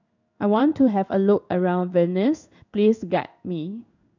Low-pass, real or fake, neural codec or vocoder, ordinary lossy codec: 7.2 kHz; fake; codec, 16 kHz in and 24 kHz out, 1 kbps, XY-Tokenizer; none